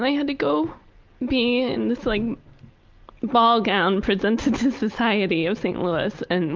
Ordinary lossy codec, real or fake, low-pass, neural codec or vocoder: Opus, 32 kbps; real; 7.2 kHz; none